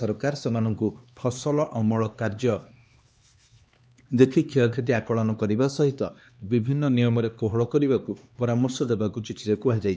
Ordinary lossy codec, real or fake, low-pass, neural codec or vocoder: none; fake; none; codec, 16 kHz, 2 kbps, X-Codec, HuBERT features, trained on LibriSpeech